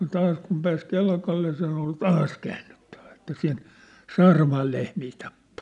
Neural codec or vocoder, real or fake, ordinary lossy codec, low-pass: none; real; none; 10.8 kHz